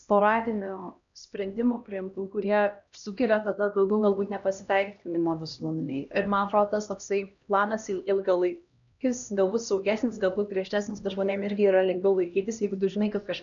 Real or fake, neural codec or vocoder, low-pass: fake; codec, 16 kHz, 1 kbps, X-Codec, HuBERT features, trained on LibriSpeech; 7.2 kHz